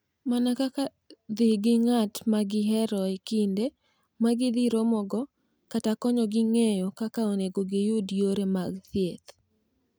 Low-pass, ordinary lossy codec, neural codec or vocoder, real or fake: none; none; none; real